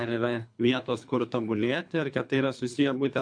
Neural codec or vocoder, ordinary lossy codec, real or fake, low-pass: codec, 24 kHz, 3 kbps, HILCodec; MP3, 64 kbps; fake; 9.9 kHz